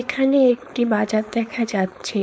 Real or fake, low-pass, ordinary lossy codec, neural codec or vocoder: fake; none; none; codec, 16 kHz, 4.8 kbps, FACodec